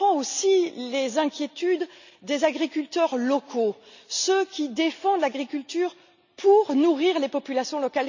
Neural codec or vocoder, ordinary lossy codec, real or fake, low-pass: none; none; real; 7.2 kHz